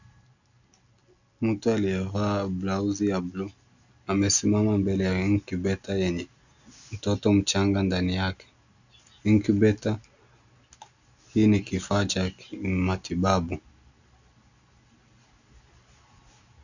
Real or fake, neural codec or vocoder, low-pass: real; none; 7.2 kHz